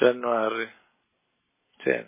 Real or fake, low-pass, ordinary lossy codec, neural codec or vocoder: real; 3.6 kHz; MP3, 16 kbps; none